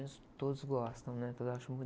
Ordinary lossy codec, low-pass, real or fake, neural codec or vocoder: none; none; real; none